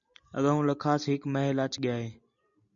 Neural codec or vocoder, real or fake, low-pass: none; real; 7.2 kHz